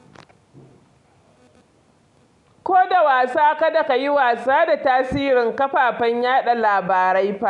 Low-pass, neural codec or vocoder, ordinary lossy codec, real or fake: 10.8 kHz; none; none; real